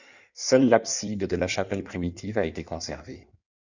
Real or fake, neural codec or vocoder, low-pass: fake; codec, 16 kHz in and 24 kHz out, 1.1 kbps, FireRedTTS-2 codec; 7.2 kHz